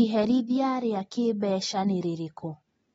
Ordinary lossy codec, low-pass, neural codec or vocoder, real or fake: AAC, 24 kbps; 19.8 kHz; none; real